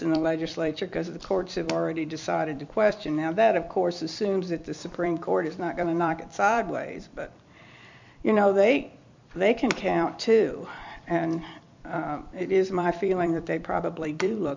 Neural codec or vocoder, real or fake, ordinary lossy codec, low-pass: vocoder, 44.1 kHz, 128 mel bands every 256 samples, BigVGAN v2; fake; MP3, 64 kbps; 7.2 kHz